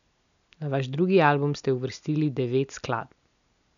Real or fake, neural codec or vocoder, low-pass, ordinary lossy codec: real; none; 7.2 kHz; none